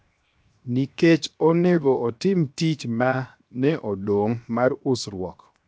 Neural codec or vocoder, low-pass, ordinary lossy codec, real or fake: codec, 16 kHz, 0.7 kbps, FocalCodec; none; none; fake